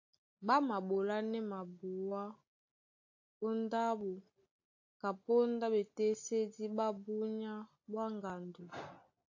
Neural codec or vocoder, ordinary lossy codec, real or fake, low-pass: none; AAC, 48 kbps; real; 7.2 kHz